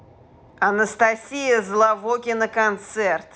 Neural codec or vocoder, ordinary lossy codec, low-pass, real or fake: none; none; none; real